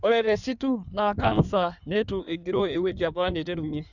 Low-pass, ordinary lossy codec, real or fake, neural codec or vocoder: 7.2 kHz; none; fake; codec, 16 kHz in and 24 kHz out, 1.1 kbps, FireRedTTS-2 codec